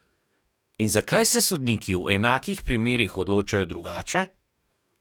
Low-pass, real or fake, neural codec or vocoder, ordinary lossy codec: 19.8 kHz; fake; codec, 44.1 kHz, 2.6 kbps, DAC; none